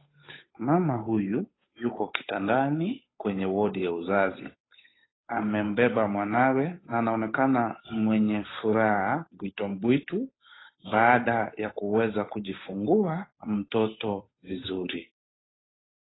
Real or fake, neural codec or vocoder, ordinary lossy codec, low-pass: fake; codec, 16 kHz, 8 kbps, FunCodec, trained on Chinese and English, 25 frames a second; AAC, 16 kbps; 7.2 kHz